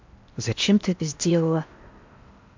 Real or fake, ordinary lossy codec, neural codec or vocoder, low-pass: fake; MP3, 64 kbps; codec, 16 kHz in and 24 kHz out, 0.8 kbps, FocalCodec, streaming, 65536 codes; 7.2 kHz